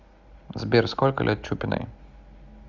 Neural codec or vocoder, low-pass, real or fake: none; 7.2 kHz; real